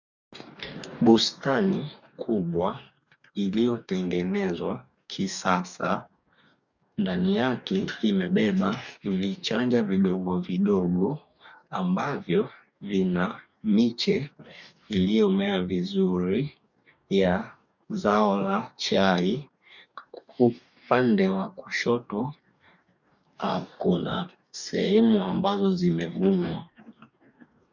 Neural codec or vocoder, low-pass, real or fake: codec, 44.1 kHz, 2.6 kbps, DAC; 7.2 kHz; fake